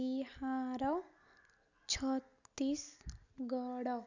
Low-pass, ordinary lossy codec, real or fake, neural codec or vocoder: 7.2 kHz; none; real; none